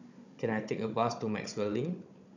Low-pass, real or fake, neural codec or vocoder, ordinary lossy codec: 7.2 kHz; fake; codec, 16 kHz, 16 kbps, FunCodec, trained on Chinese and English, 50 frames a second; none